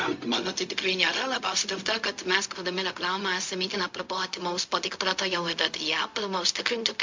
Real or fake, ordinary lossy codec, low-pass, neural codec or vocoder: fake; MP3, 48 kbps; 7.2 kHz; codec, 16 kHz, 0.4 kbps, LongCat-Audio-Codec